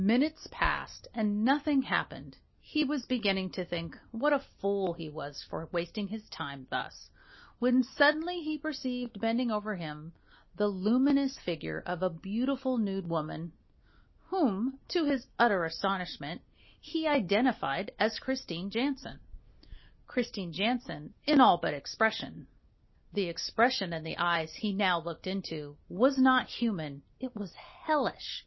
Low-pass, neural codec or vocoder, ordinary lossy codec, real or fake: 7.2 kHz; none; MP3, 24 kbps; real